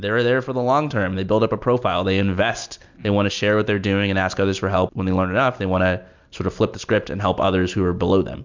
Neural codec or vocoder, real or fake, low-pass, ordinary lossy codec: none; real; 7.2 kHz; MP3, 64 kbps